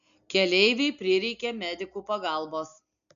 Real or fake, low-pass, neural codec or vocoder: real; 7.2 kHz; none